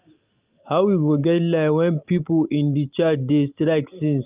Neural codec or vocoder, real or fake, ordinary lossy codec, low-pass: none; real; Opus, 24 kbps; 3.6 kHz